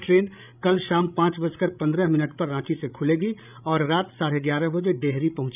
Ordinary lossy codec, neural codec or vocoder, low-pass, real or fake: none; codec, 16 kHz, 16 kbps, FreqCodec, larger model; 3.6 kHz; fake